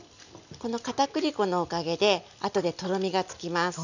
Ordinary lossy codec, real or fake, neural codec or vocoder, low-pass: none; real; none; 7.2 kHz